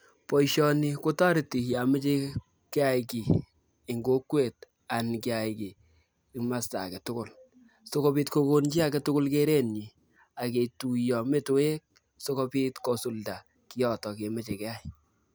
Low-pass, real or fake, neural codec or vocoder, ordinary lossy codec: none; real; none; none